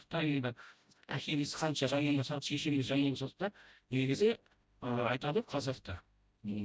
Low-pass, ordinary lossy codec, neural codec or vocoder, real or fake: none; none; codec, 16 kHz, 0.5 kbps, FreqCodec, smaller model; fake